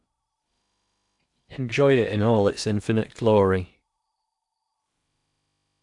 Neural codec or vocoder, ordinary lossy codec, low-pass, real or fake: codec, 16 kHz in and 24 kHz out, 0.8 kbps, FocalCodec, streaming, 65536 codes; none; 10.8 kHz; fake